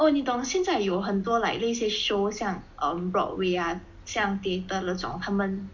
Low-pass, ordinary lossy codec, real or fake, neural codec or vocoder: 7.2 kHz; MP3, 48 kbps; real; none